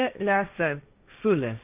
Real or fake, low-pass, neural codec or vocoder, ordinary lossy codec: fake; 3.6 kHz; codec, 16 kHz, 1.1 kbps, Voila-Tokenizer; MP3, 32 kbps